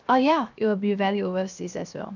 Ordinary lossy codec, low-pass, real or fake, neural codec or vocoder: none; 7.2 kHz; fake; codec, 16 kHz, 0.7 kbps, FocalCodec